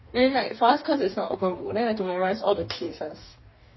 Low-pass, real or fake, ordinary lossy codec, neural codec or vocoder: 7.2 kHz; fake; MP3, 24 kbps; codec, 44.1 kHz, 2.6 kbps, DAC